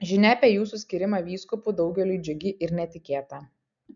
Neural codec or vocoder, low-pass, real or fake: none; 7.2 kHz; real